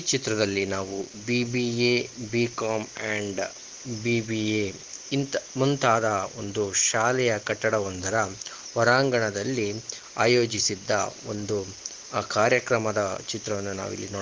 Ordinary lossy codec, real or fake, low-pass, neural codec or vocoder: Opus, 16 kbps; real; 7.2 kHz; none